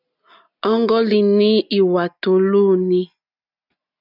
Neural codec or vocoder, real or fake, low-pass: none; real; 5.4 kHz